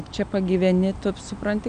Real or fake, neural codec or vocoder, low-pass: real; none; 9.9 kHz